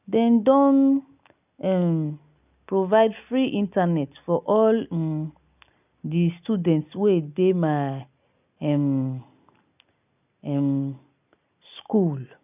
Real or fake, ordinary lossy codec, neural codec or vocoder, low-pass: real; none; none; 3.6 kHz